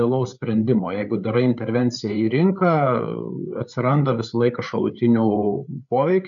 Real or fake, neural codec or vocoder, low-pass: fake; codec, 16 kHz, 8 kbps, FreqCodec, larger model; 7.2 kHz